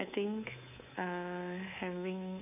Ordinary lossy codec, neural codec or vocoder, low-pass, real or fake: none; codec, 24 kHz, 3.1 kbps, DualCodec; 3.6 kHz; fake